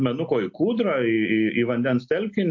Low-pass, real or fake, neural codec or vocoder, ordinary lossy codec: 7.2 kHz; real; none; MP3, 48 kbps